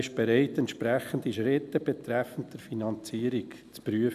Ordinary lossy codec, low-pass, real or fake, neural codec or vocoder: AAC, 96 kbps; 14.4 kHz; real; none